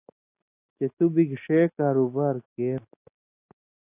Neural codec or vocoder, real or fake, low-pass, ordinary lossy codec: none; real; 3.6 kHz; AAC, 24 kbps